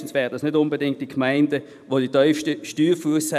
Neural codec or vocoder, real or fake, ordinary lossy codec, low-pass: vocoder, 44.1 kHz, 128 mel bands every 512 samples, BigVGAN v2; fake; none; 14.4 kHz